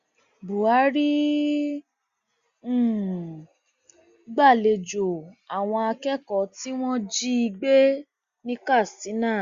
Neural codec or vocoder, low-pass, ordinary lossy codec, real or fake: none; 7.2 kHz; Opus, 64 kbps; real